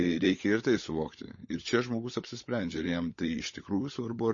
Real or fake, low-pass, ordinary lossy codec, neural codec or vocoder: fake; 7.2 kHz; MP3, 32 kbps; codec, 16 kHz, 16 kbps, FunCodec, trained on LibriTTS, 50 frames a second